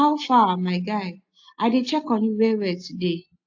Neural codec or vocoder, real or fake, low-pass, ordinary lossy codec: none; real; 7.2 kHz; AAC, 48 kbps